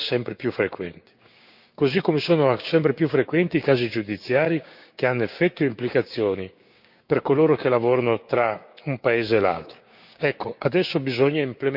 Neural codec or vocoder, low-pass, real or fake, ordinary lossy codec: codec, 16 kHz, 6 kbps, DAC; 5.4 kHz; fake; none